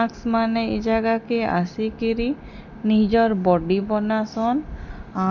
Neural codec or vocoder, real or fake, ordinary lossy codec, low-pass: none; real; none; 7.2 kHz